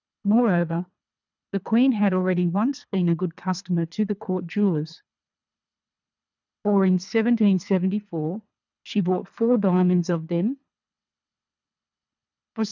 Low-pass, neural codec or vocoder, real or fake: 7.2 kHz; codec, 24 kHz, 3 kbps, HILCodec; fake